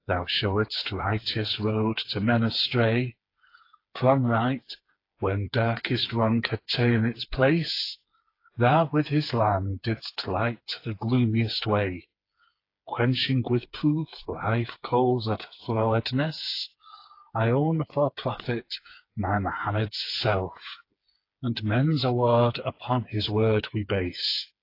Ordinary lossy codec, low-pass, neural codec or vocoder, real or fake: AAC, 32 kbps; 5.4 kHz; codec, 16 kHz, 4 kbps, FreqCodec, smaller model; fake